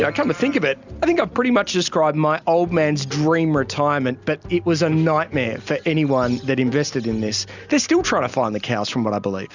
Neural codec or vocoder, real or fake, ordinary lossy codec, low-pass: none; real; Opus, 64 kbps; 7.2 kHz